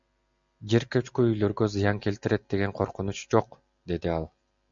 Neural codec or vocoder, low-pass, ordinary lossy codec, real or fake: none; 7.2 kHz; MP3, 64 kbps; real